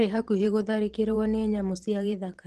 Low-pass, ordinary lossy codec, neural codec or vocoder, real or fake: 14.4 kHz; Opus, 16 kbps; vocoder, 44.1 kHz, 128 mel bands every 512 samples, BigVGAN v2; fake